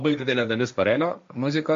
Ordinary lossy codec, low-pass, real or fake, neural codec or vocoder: MP3, 48 kbps; 7.2 kHz; fake; codec, 16 kHz, 1.1 kbps, Voila-Tokenizer